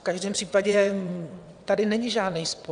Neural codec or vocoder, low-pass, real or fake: vocoder, 22.05 kHz, 80 mel bands, WaveNeXt; 9.9 kHz; fake